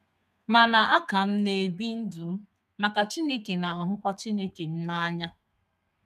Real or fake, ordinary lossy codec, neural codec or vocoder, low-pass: fake; none; codec, 32 kHz, 1.9 kbps, SNAC; 14.4 kHz